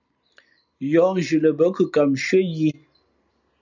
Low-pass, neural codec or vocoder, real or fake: 7.2 kHz; none; real